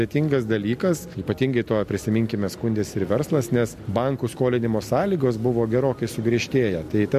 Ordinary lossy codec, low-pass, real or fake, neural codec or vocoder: MP3, 64 kbps; 14.4 kHz; real; none